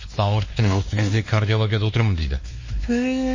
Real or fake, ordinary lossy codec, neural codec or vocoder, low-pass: fake; MP3, 32 kbps; codec, 16 kHz, 2 kbps, X-Codec, WavLM features, trained on Multilingual LibriSpeech; 7.2 kHz